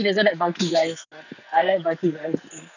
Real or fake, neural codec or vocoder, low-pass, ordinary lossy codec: fake; codec, 44.1 kHz, 2.6 kbps, SNAC; 7.2 kHz; none